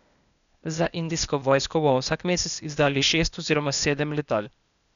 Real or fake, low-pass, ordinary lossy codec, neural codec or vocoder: fake; 7.2 kHz; none; codec, 16 kHz, 0.8 kbps, ZipCodec